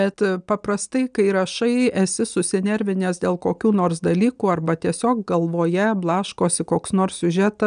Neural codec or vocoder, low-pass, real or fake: none; 9.9 kHz; real